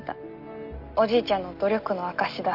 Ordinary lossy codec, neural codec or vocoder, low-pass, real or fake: Opus, 32 kbps; none; 5.4 kHz; real